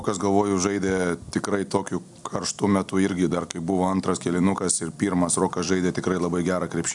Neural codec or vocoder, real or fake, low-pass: none; real; 10.8 kHz